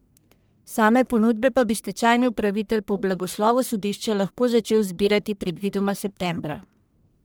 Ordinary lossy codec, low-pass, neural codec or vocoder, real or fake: none; none; codec, 44.1 kHz, 1.7 kbps, Pupu-Codec; fake